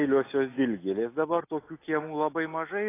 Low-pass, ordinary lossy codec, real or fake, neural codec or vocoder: 3.6 kHz; AAC, 24 kbps; real; none